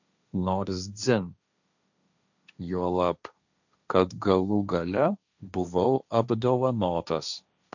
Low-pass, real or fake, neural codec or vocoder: 7.2 kHz; fake; codec, 16 kHz, 1.1 kbps, Voila-Tokenizer